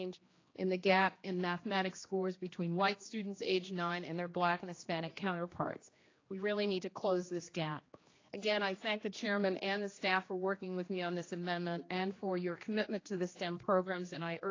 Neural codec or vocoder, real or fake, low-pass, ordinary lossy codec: codec, 16 kHz, 2 kbps, X-Codec, HuBERT features, trained on general audio; fake; 7.2 kHz; AAC, 32 kbps